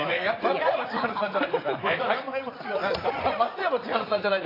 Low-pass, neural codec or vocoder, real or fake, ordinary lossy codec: 5.4 kHz; vocoder, 44.1 kHz, 80 mel bands, Vocos; fake; AAC, 24 kbps